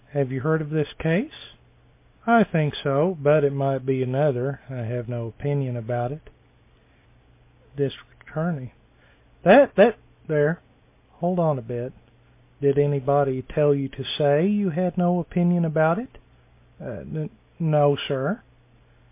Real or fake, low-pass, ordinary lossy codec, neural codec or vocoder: real; 3.6 kHz; MP3, 24 kbps; none